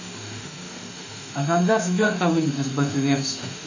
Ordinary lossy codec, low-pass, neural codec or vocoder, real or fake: AAC, 48 kbps; 7.2 kHz; autoencoder, 48 kHz, 32 numbers a frame, DAC-VAE, trained on Japanese speech; fake